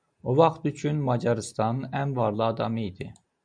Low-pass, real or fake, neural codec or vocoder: 9.9 kHz; real; none